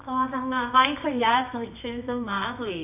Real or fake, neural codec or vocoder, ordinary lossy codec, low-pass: fake; codec, 24 kHz, 0.9 kbps, WavTokenizer, medium music audio release; none; 3.6 kHz